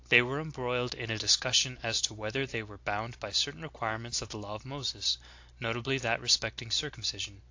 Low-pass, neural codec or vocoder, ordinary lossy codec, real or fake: 7.2 kHz; none; AAC, 48 kbps; real